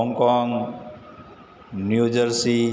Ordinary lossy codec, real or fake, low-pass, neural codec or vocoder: none; real; none; none